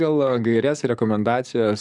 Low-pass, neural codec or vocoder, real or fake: 10.8 kHz; codec, 44.1 kHz, 7.8 kbps, DAC; fake